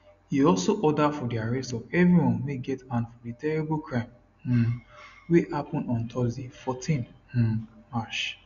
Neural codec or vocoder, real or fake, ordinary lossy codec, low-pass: none; real; none; 7.2 kHz